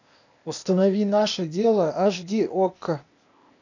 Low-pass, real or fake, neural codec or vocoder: 7.2 kHz; fake; codec, 16 kHz, 0.8 kbps, ZipCodec